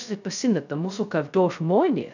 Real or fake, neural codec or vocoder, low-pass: fake; codec, 16 kHz, 0.2 kbps, FocalCodec; 7.2 kHz